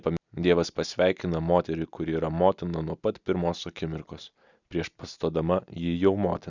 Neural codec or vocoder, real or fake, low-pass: none; real; 7.2 kHz